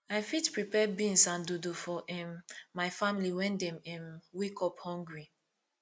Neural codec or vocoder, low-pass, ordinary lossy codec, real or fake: none; none; none; real